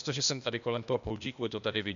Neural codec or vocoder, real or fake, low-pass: codec, 16 kHz, 0.8 kbps, ZipCodec; fake; 7.2 kHz